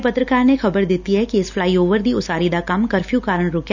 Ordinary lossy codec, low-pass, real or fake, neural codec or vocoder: none; 7.2 kHz; real; none